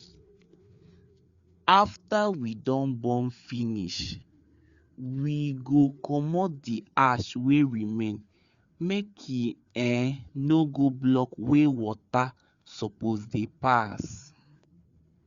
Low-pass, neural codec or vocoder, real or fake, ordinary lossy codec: 7.2 kHz; codec, 16 kHz, 4 kbps, FreqCodec, larger model; fake; Opus, 64 kbps